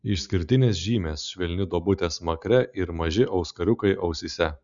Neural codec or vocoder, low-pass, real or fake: none; 7.2 kHz; real